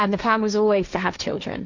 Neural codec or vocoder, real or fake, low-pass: codec, 16 kHz, 1.1 kbps, Voila-Tokenizer; fake; 7.2 kHz